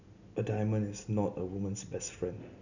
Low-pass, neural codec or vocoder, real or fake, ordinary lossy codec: 7.2 kHz; none; real; none